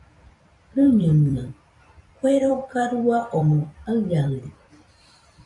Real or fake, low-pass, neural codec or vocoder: fake; 10.8 kHz; vocoder, 44.1 kHz, 128 mel bands every 256 samples, BigVGAN v2